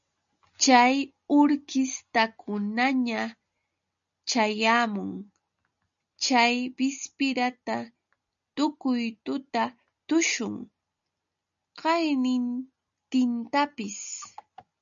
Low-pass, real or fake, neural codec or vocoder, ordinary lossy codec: 7.2 kHz; real; none; MP3, 96 kbps